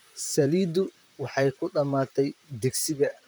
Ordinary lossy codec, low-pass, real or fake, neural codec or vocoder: none; none; fake; vocoder, 44.1 kHz, 128 mel bands, Pupu-Vocoder